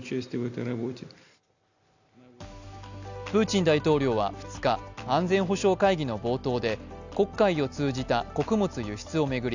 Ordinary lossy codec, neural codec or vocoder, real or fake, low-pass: none; none; real; 7.2 kHz